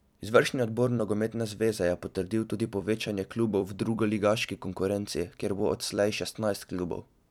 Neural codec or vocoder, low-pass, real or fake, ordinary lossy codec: vocoder, 44.1 kHz, 128 mel bands every 256 samples, BigVGAN v2; 19.8 kHz; fake; none